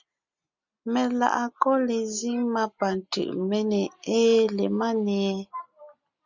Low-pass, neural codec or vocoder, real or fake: 7.2 kHz; none; real